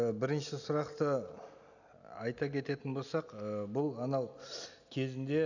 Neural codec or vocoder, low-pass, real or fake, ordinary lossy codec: none; 7.2 kHz; real; none